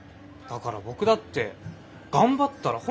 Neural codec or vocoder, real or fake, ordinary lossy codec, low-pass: none; real; none; none